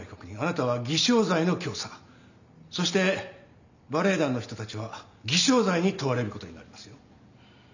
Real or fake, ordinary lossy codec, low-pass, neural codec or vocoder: real; none; 7.2 kHz; none